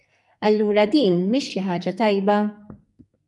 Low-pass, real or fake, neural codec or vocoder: 10.8 kHz; fake; codec, 44.1 kHz, 2.6 kbps, SNAC